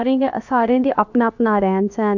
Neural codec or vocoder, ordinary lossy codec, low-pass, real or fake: codec, 16 kHz, about 1 kbps, DyCAST, with the encoder's durations; none; 7.2 kHz; fake